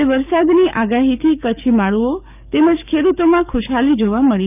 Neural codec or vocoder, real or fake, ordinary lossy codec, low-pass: codec, 44.1 kHz, 7.8 kbps, DAC; fake; none; 3.6 kHz